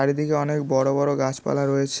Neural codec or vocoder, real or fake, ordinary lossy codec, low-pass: none; real; none; none